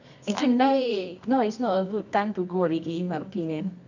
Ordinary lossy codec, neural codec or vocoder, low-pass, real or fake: none; codec, 24 kHz, 0.9 kbps, WavTokenizer, medium music audio release; 7.2 kHz; fake